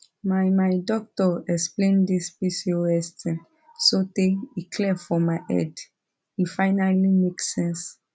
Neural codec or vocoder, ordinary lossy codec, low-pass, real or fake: none; none; none; real